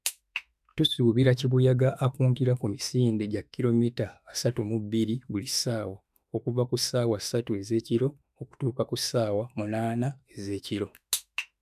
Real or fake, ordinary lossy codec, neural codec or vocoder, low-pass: fake; none; autoencoder, 48 kHz, 32 numbers a frame, DAC-VAE, trained on Japanese speech; 14.4 kHz